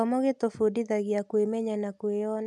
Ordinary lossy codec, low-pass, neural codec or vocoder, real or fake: none; none; none; real